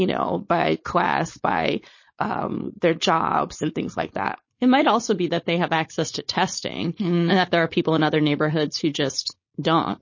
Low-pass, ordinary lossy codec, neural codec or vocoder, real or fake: 7.2 kHz; MP3, 32 kbps; codec, 16 kHz, 4.8 kbps, FACodec; fake